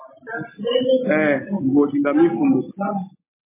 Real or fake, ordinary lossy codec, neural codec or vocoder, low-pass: real; MP3, 16 kbps; none; 3.6 kHz